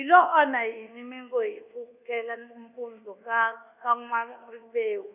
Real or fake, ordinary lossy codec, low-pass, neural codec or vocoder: fake; none; 3.6 kHz; codec, 24 kHz, 1.2 kbps, DualCodec